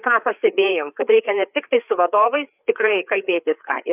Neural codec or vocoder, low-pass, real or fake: codec, 16 kHz, 4 kbps, FreqCodec, larger model; 3.6 kHz; fake